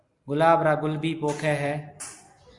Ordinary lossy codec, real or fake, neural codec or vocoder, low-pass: Opus, 64 kbps; real; none; 10.8 kHz